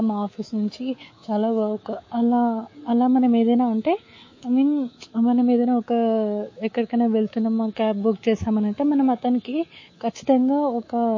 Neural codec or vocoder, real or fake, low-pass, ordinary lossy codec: codec, 24 kHz, 3.1 kbps, DualCodec; fake; 7.2 kHz; MP3, 32 kbps